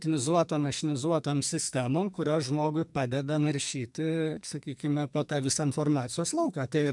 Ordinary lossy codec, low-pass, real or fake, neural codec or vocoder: MP3, 96 kbps; 10.8 kHz; fake; codec, 44.1 kHz, 2.6 kbps, SNAC